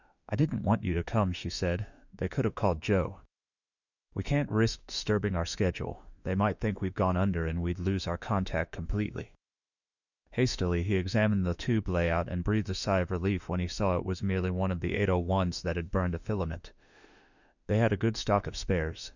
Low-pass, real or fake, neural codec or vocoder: 7.2 kHz; fake; autoencoder, 48 kHz, 32 numbers a frame, DAC-VAE, trained on Japanese speech